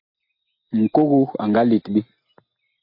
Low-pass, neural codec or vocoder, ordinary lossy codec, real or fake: 5.4 kHz; none; MP3, 32 kbps; real